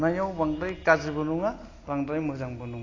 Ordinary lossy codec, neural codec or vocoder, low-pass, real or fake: AAC, 32 kbps; none; 7.2 kHz; real